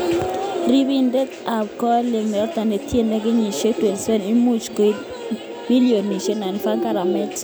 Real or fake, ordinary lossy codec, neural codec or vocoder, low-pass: real; none; none; none